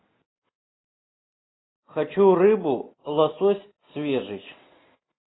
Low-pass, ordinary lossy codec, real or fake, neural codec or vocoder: 7.2 kHz; AAC, 16 kbps; real; none